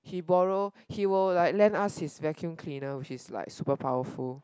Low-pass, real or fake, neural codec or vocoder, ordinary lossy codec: none; real; none; none